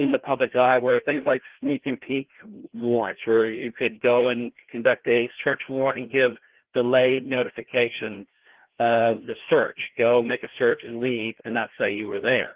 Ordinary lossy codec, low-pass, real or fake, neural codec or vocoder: Opus, 16 kbps; 3.6 kHz; fake; codec, 16 kHz, 1 kbps, FreqCodec, larger model